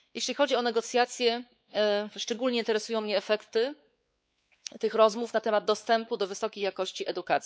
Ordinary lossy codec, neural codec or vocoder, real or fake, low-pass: none; codec, 16 kHz, 4 kbps, X-Codec, WavLM features, trained on Multilingual LibriSpeech; fake; none